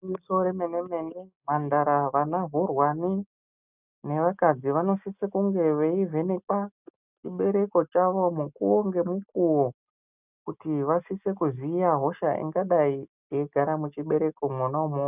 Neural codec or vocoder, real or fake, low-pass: none; real; 3.6 kHz